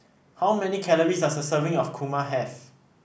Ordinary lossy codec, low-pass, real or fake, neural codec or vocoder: none; none; real; none